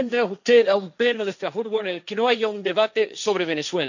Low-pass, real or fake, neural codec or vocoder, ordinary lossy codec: 7.2 kHz; fake; codec, 16 kHz, 1.1 kbps, Voila-Tokenizer; none